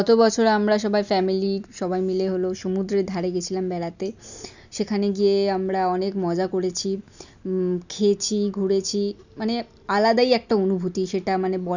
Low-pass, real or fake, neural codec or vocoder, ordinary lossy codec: 7.2 kHz; real; none; none